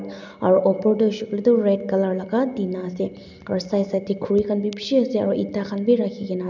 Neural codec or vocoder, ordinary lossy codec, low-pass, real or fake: none; none; 7.2 kHz; real